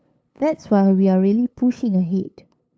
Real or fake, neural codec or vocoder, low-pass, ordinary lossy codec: fake; codec, 16 kHz, 8 kbps, FunCodec, trained on LibriTTS, 25 frames a second; none; none